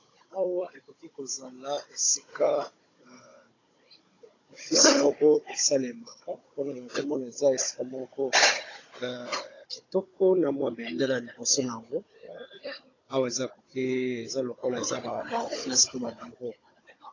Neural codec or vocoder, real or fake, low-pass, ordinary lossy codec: codec, 16 kHz, 16 kbps, FunCodec, trained on Chinese and English, 50 frames a second; fake; 7.2 kHz; AAC, 32 kbps